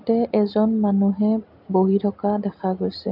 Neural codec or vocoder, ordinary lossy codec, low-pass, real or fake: none; AAC, 48 kbps; 5.4 kHz; real